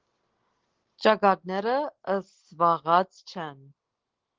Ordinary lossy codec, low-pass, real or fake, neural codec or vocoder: Opus, 16 kbps; 7.2 kHz; real; none